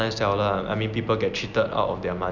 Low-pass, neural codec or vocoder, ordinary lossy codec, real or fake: 7.2 kHz; none; none; real